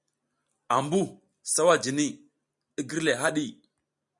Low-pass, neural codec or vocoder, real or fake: 10.8 kHz; none; real